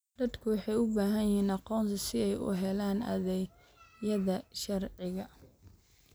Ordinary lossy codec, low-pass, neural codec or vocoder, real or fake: none; none; none; real